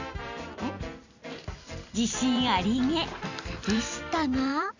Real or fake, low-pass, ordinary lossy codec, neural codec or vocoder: fake; 7.2 kHz; none; vocoder, 44.1 kHz, 128 mel bands every 256 samples, BigVGAN v2